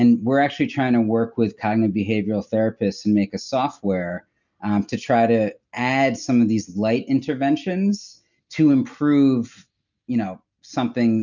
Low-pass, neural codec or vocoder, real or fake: 7.2 kHz; none; real